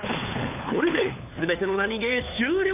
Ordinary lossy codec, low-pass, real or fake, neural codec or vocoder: none; 3.6 kHz; fake; codec, 16 kHz, 4 kbps, FunCodec, trained on LibriTTS, 50 frames a second